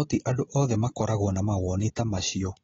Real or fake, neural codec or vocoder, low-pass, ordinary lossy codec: real; none; 7.2 kHz; AAC, 24 kbps